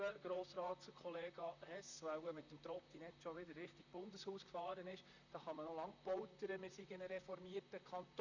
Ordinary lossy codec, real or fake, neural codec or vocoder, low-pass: none; fake; vocoder, 44.1 kHz, 128 mel bands, Pupu-Vocoder; 7.2 kHz